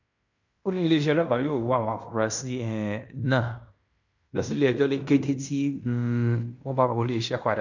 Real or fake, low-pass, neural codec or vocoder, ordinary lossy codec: fake; 7.2 kHz; codec, 16 kHz in and 24 kHz out, 0.9 kbps, LongCat-Audio-Codec, fine tuned four codebook decoder; none